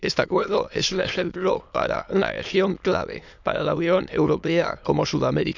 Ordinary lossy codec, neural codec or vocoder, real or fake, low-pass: none; autoencoder, 22.05 kHz, a latent of 192 numbers a frame, VITS, trained on many speakers; fake; 7.2 kHz